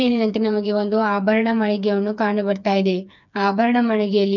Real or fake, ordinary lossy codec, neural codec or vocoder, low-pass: fake; none; codec, 16 kHz, 4 kbps, FreqCodec, smaller model; 7.2 kHz